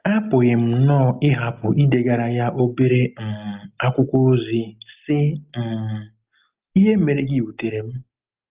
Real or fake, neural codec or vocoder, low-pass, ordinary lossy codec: real; none; 3.6 kHz; Opus, 32 kbps